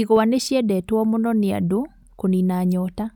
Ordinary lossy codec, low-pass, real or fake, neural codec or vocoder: none; 19.8 kHz; real; none